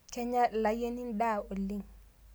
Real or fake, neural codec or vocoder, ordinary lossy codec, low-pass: real; none; none; none